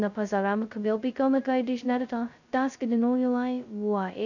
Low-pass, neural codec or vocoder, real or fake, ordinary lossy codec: 7.2 kHz; codec, 16 kHz, 0.2 kbps, FocalCodec; fake; none